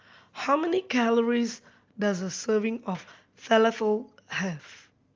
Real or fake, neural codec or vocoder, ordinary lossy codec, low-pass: real; none; Opus, 32 kbps; 7.2 kHz